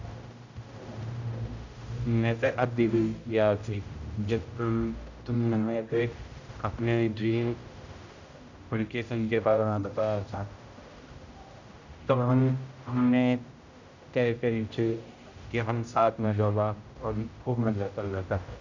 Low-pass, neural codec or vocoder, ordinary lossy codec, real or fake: 7.2 kHz; codec, 16 kHz, 0.5 kbps, X-Codec, HuBERT features, trained on general audio; none; fake